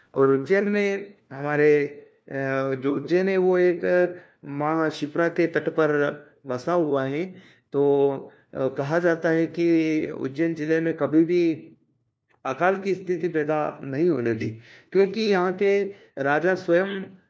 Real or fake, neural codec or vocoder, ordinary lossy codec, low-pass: fake; codec, 16 kHz, 1 kbps, FunCodec, trained on LibriTTS, 50 frames a second; none; none